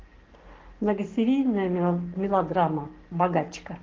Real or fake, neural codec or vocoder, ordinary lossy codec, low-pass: fake; codec, 44.1 kHz, 7.8 kbps, Pupu-Codec; Opus, 16 kbps; 7.2 kHz